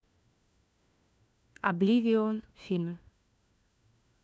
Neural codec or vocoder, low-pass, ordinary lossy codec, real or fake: codec, 16 kHz, 1 kbps, FunCodec, trained on LibriTTS, 50 frames a second; none; none; fake